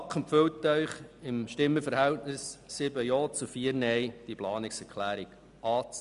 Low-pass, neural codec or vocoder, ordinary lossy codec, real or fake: 10.8 kHz; none; none; real